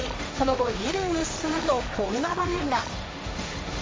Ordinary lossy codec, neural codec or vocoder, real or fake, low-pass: none; codec, 16 kHz, 1.1 kbps, Voila-Tokenizer; fake; none